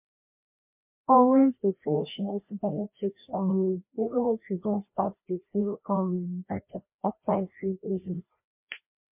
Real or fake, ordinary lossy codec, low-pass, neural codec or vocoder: fake; MP3, 32 kbps; 3.6 kHz; codec, 16 kHz, 1 kbps, FreqCodec, larger model